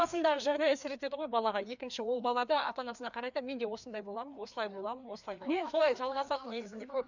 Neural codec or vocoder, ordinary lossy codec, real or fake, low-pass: codec, 16 kHz, 2 kbps, FreqCodec, larger model; none; fake; 7.2 kHz